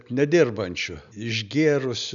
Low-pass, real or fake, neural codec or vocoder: 7.2 kHz; real; none